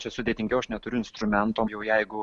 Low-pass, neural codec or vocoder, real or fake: 10.8 kHz; none; real